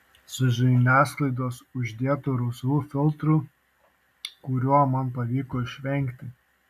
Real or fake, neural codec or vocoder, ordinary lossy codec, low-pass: real; none; AAC, 96 kbps; 14.4 kHz